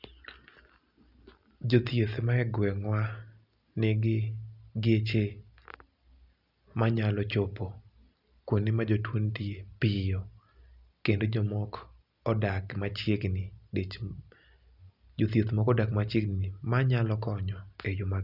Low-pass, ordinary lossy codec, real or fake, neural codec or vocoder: 5.4 kHz; none; real; none